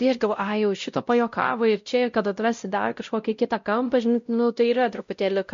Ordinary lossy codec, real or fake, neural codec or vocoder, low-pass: MP3, 48 kbps; fake; codec, 16 kHz, 0.5 kbps, X-Codec, WavLM features, trained on Multilingual LibriSpeech; 7.2 kHz